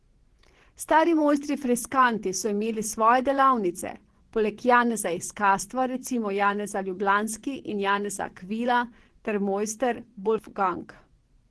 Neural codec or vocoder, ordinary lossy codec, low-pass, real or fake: vocoder, 24 kHz, 100 mel bands, Vocos; Opus, 16 kbps; 10.8 kHz; fake